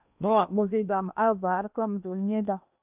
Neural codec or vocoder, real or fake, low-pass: codec, 16 kHz in and 24 kHz out, 0.8 kbps, FocalCodec, streaming, 65536 codes; fake; 3.6 kHz